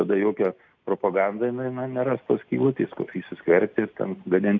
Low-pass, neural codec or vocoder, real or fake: 7.2 kHz; none; real